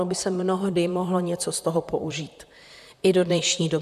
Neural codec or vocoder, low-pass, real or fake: vocoder, 44.1 kHz, 128 mel bands, Pupu-Vocoder; 14.4 kHz; fake